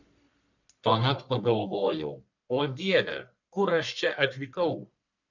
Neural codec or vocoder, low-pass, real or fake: codec, 44.1 kHz, 1.7 kbps, Pupu-Codec; 7.2 kHz; fake